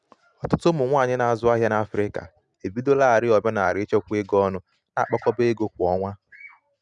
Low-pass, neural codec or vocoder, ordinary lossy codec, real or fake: 10.8 kHz; none; none; real